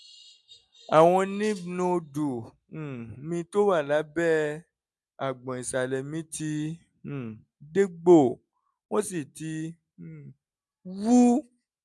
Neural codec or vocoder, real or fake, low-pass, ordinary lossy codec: none; real; none; none